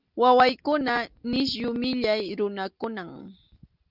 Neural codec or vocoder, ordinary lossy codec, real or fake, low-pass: none; Opus, 32 kbps; real; 5.4 kHz